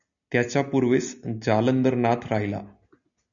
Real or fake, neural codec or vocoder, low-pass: real; none; 7.2 kHz